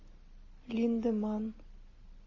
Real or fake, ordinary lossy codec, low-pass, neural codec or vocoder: real; MP3, 32 kbps; 7.2 kHz; none